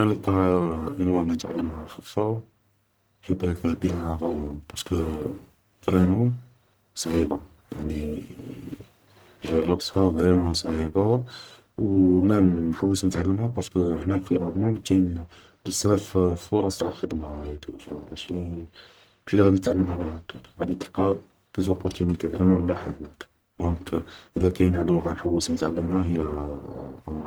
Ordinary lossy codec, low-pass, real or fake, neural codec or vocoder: none; none; fake; codec, 44.1 kHz, 1.7 kbps, Pupu-Codec